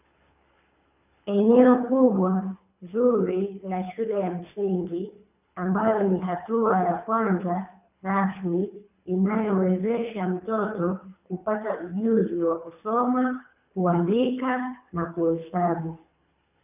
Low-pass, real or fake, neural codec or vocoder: 3.6 kHz; fake; codec, 24 kHz, 3 kbps, HILCodec